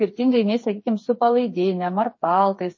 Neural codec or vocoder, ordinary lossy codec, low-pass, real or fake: codec, 16 kHz, 4 kbps, FreqCodec, smaller model; MP3, 32 kbps; 7.2 kHz; fake